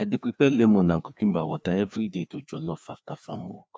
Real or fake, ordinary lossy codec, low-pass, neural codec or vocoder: fake; none; none; codec, 16 kHz, 2 kbps, FreqCodec, larger model